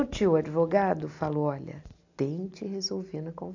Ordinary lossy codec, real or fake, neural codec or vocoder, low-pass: AAC, 48 kbps; real; none; 7.2 kHz